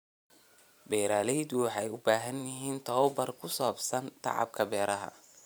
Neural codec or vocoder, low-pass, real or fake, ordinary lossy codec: vocoder, 44.1 kHz, 128 mel bands every 512 samples, BigVGAN v2; none; fake; none